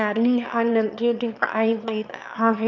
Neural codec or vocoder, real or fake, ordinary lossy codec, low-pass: autoencoder, 22.05 kHz, a latent of 192 numbers a frame, VITS, trained on one speaker; fake; none; 7.2 kHz